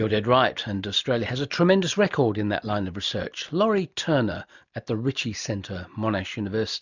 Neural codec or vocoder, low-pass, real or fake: none; 7.2 kHz; real